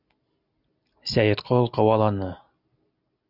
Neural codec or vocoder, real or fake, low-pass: none; real; 5.4 kHz